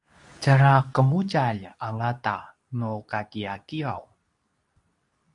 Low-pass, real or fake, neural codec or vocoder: 10.8 kHz; fake; codec, 24 kHz, 0.9 kbps, WavTokenizer, medium speech release version 2